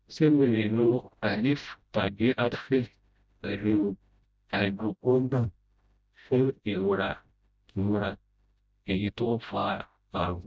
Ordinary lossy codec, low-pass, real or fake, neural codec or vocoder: none; none; fake; codec, 16 kHz, 0.5 kbps, FreqCodec, smaller model